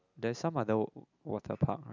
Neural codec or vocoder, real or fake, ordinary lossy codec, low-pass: none; real; none; 7.2 kHz